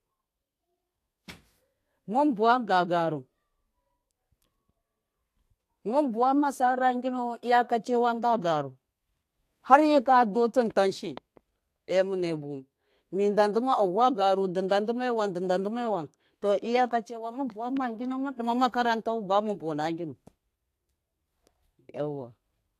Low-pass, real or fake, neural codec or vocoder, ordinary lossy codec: 14.4 kHz; fake; codec, 32 kHz, 1.9 kbps, SNAC; AAC, 64 kbps